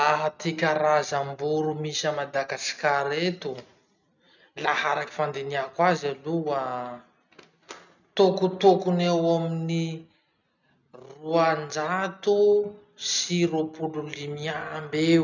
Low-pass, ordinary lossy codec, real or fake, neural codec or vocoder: 7.2 kHz; none; real; none